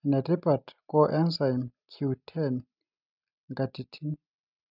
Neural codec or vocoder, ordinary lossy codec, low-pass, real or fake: none; none; 5.4 kHz; real